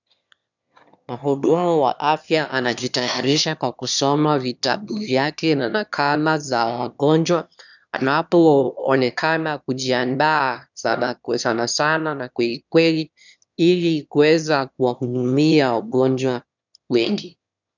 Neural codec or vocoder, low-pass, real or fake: autoencoder, 22.05 kHz, a latent of 192 numbers a frame, VITS, trained on one speaker; 7.2 kHz; fake